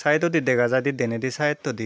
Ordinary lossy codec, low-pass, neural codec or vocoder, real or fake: none; none; none; real